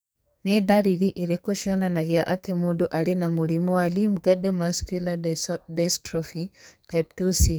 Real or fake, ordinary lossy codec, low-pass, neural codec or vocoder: fake; none; none; codec, 44.1 kHz, 2.6 kbps, SNAC